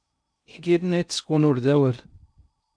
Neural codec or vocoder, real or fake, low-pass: codec, 16 kHz in and 24 kHz out, 0.6 kbps, FocalCodec, streaming, 2048 codes; fake; 9.9 kHz